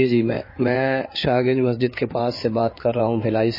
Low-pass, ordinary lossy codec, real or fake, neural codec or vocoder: 5.4 kHz; MP3, 24 kbps; fake; codec, 16 kHz in and 24 kHz out, 2.2 kbps, FireRedTTS-2 codec